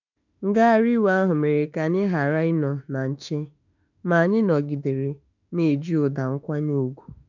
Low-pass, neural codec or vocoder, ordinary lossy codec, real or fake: 7.2 kHz; autoencoder, 48 kHz, 32 numbers a frame, DAC-VAE, trained on Japanese speech; none; fake